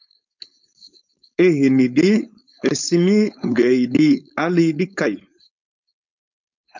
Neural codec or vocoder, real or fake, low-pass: codec, 16 kHz, 4.8 kbps, FACodec; fake; 7.2 kHz